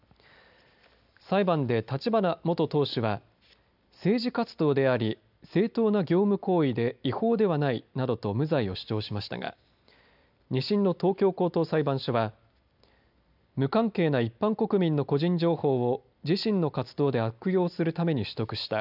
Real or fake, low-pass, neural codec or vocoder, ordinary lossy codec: real; 5.4 kHz; none; none